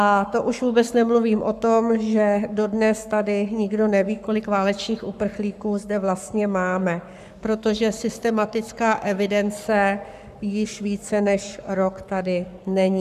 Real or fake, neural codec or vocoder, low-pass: fake; codec, 44.1 kHz, 7.8 kbps, Pupu-Codec; 14.4 kHz